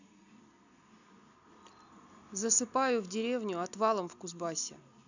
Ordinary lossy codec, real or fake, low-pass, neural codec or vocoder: none; real; 7.2 kHz; none